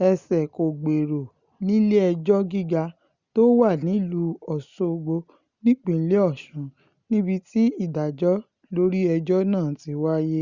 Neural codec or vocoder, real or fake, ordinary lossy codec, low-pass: none; real; Opus, 64 kbps; 7.2 kHz